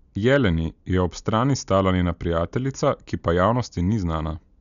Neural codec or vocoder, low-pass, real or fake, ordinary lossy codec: none; 7.2 kHz; real; none